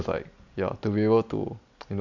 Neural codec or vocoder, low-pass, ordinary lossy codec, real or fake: none; 7.2 kHz; none; real